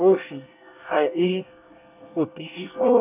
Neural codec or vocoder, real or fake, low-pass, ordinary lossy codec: codec, 24 kHz, 1 kbps, SNAC; fake; 3.6 kHz; none